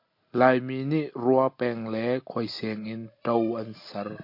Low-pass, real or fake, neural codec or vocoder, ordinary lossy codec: 5.4 kHz; real; none; MP3, 32 kbps